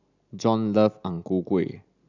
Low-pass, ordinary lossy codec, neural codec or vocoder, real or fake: 7.2 kHz; none; none; real